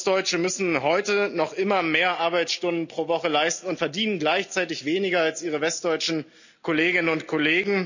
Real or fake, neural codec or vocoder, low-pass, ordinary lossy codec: real; none; 7.2 kHz; none